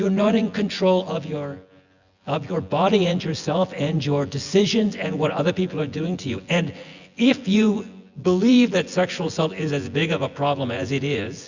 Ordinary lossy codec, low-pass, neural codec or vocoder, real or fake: Opus, 64 kbps; 7.2 kHz; vocoder, 24 kHz, 100 mel bands, Vocos; fake